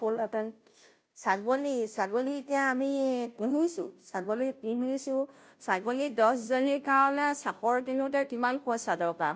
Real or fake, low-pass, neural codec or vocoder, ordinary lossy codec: fake; none; codec, 16 kHz, 0.5 kbps, FunCodec, trained on Chinese and English, 25 frames a second; none